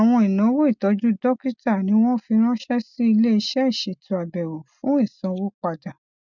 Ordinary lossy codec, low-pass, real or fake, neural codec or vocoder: none; 7.2 kHz; real; none